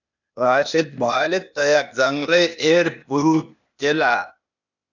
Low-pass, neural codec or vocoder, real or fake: 7.2 kHz; codec, 16 kHz, 0.8 kbps, ZipCodec; fake